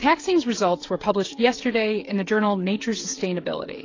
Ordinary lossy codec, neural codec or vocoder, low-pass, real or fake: AAC, 32 kbps; codec, 16 kHz, 8 kbps, FreqCodec, smaller model; 7.2 kHz; fake